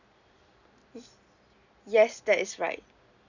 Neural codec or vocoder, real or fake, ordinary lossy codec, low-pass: none; real; none; 7.2 kHz